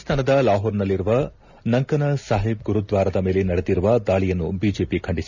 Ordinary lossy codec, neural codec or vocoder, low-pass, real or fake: none; none; 7.2 kHz; real